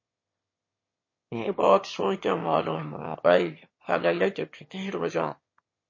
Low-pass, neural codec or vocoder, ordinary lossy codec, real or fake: 7.2 kHz; autoencoder, 22.05 kHz, a latent of 192 numbers a frame, VITS, trained on one speaker; MP3, 32 kbps; fake